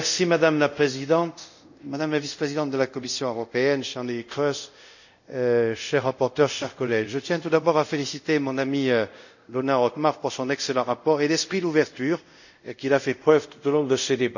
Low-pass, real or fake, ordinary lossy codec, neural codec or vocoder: 7.2 kHz; fake; none; codec, 24 kHz, 0.5 kbps, DualCodec